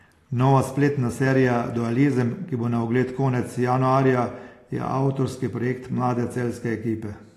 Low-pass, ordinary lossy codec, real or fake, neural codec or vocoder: 14.4 kHz; AAC, 48 kbps; real; none